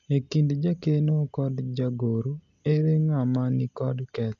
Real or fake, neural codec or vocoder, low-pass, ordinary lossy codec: real; none; 7.2 kHz; AAC, 64 kbps